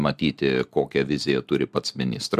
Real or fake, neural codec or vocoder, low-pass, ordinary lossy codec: real; none; 14.4 kHz; MP3, 96 kbps